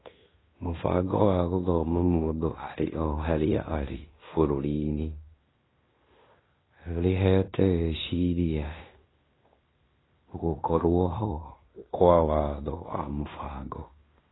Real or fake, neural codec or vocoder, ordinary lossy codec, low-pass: fake; codec, 16 kHz in and 24 kHz out, 0.9 kbps, LongCat-Audio-Codec, fine tuned four codebook decoder; AAC, 16 kbps; 7.2 kHz